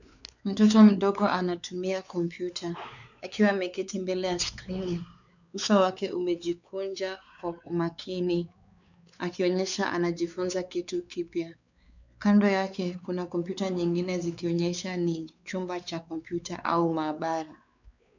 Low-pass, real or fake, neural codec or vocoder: 7.2 kHz; fake; codec, 16 kHz, 4 kbps, X-Codec, WavLM features, trained on Multilingual LibriSpeech